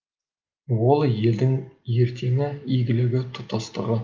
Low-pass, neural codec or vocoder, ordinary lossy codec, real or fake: 7.2 kHz; none; Opus, 24 kbps; real